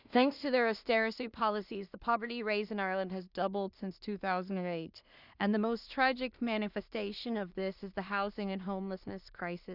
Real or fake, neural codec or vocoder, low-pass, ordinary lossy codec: fake; codec, 16 kHz in and 24 kHz out, 0.4 kbps, LongCat-Audio-Codec, two codebook decoder; 5.4 kHz; Opus, 64 kbps